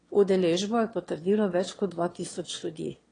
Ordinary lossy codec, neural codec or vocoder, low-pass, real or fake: AAC, 32 kbps; autoencoder, 22.05 kHz, a latent of 192 numbers a frame, VITS, trained on one speaker; 9.9 kHz; fake